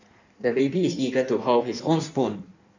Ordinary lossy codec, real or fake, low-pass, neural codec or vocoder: none; fake; 7.2 kHz; codec, 16 kHz in and 24 kHz out, 1.1 kbps, FireRedTTS-2 codec